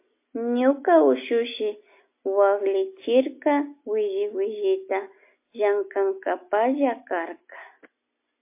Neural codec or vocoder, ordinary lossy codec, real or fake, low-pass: none; MP3, 24 kbps; real; 3.6 kHz